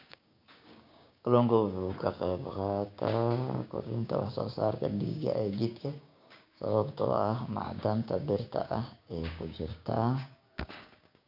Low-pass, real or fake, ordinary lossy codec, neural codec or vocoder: 5.4 kHz; fake; none; codec, 16 kHz, 6 kbps, DAC